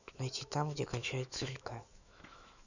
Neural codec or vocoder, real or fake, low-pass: autoencoder, 48 kHz, 128 numbers a frame, DAC-VAE, trained on Japanese speech; fake; 7.2 kHz